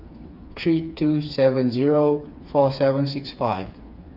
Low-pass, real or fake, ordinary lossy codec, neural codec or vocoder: 5.4 kHz; fake; Opus, 64 kbps; codec, 16 kHz, 4 kbps, FreqCodec, smaller model